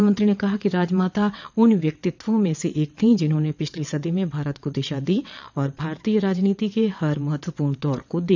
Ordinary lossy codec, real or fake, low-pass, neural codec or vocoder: none; fake; 7.2 kHz; vocoder, 22.05 kHz, 80 mel bands, WaveNeXt